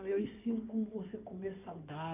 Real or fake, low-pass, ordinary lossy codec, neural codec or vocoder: fake; 3.6 kHz; none; codec, 16 kHz in and 24 kHz out, 2.2 kbps, FireRedTTS-2 codec